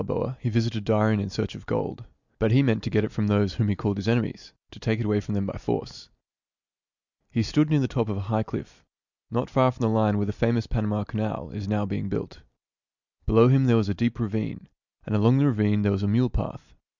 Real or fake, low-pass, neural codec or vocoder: real; 7.2 kHz; none